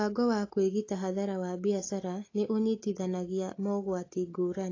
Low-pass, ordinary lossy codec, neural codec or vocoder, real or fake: 7.2 kHz; AAC, 32 kbps; none; real